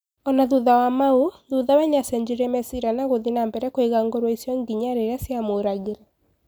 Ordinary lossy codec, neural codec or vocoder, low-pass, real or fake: none; none; none; real